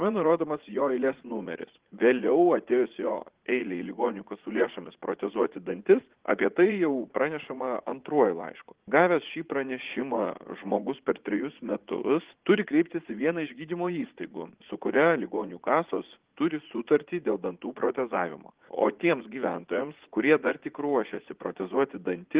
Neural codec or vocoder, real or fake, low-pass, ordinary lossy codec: vocoder, 44.1 kHz, 80 mel bands, Vocos; fake; 3.6 kHz; Opus, 16 kbps